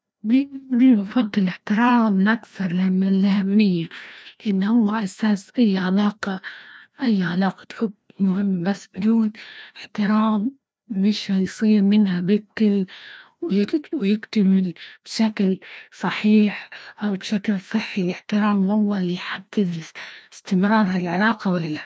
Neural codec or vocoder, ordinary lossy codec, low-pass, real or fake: codec, 16 kHz, 1 kbps, FreqCodec, larger model; none; none; fake